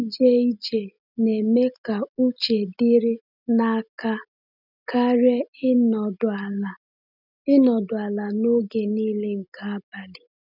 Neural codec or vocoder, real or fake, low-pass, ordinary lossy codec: none; real; 5.4 kHz; none